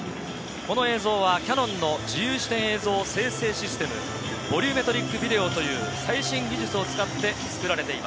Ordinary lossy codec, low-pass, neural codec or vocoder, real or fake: none; none; none; real